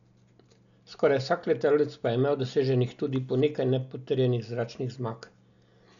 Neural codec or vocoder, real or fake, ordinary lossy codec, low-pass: none; real; none; 7.2 kHz